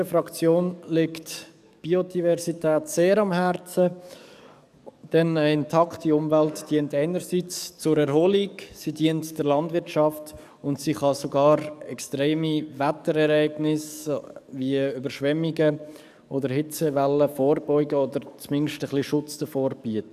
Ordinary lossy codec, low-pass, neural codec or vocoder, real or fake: none; 14.4 kHz; codec, 44.1 kHz, 7.8 kbps, DAC; fake